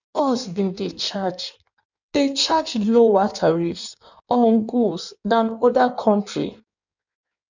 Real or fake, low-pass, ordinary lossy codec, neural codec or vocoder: fake; 7.2 kHz; none; codec, 16 kHz in and 24 kHz out, 1.1 kbps, FireRedTTS-2 codec